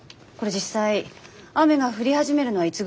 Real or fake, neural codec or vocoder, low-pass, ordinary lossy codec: real; none; none; none